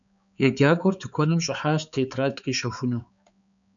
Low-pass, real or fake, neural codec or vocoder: 7.2 kHz; fake; codec, 16 kHz, 4 kbps, X-Codec, HuBERT features, trained on balanced general audio